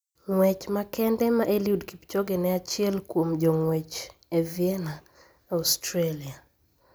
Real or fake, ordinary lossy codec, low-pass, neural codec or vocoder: real; none; none; none